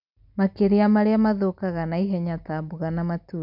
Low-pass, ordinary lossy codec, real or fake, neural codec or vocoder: 5.4 kHz; none; real; none